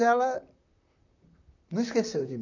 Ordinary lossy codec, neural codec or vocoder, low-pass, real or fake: none; vocoder, 44.1 kHz, 128 mel bands, Pupu-Vocoder; 7.2 kHz; fake